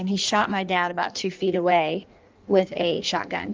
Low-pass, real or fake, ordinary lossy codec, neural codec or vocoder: 7.2 kHz; fake; Opus, 24 kbps; codec, 16 kHz in and 24 kHz out, 1.1 kbps, FireRedTTS-2 codec